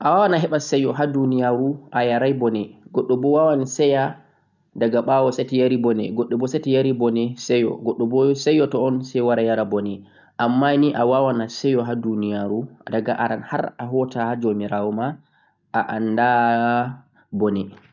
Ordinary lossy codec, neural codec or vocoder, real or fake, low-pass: none; none; real; 7.2 kHz